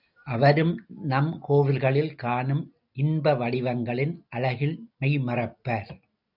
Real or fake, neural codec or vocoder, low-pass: real; none; 5.4 kHz